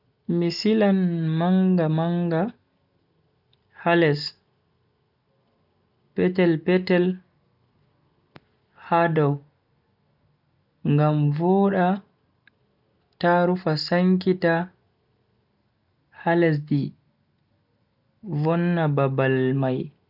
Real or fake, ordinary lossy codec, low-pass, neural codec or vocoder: real; none; 5.4 kHz; none